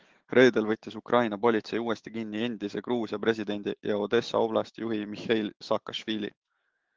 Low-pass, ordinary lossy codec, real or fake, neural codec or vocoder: 7.2 kHz; Opus, 16 kbps; fake; autoencoder, 48 kHz, 128 numbers a frame, DAC-VAE, trained on Japanese speech